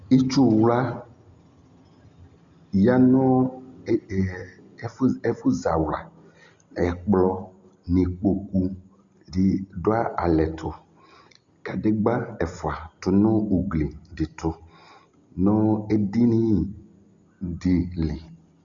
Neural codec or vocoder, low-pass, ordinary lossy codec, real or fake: none; 7.2 kHz; Opus, 64 kbps; real